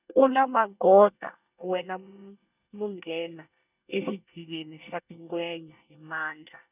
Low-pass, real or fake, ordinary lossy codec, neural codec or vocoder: 3.6 kHz; fake; none; codec, 24 kHz, 1 kbps, SNAC